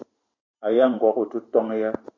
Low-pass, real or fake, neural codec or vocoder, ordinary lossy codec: 7.2 kHz; fake; vocoder, 44.1 kHz, 128 mel bands every 512 samples, BigVGAN v2; AAC, 32 kbps